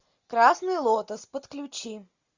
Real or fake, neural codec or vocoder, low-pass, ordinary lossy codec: real; none; 7.2 kHz; Opus, 64 kbps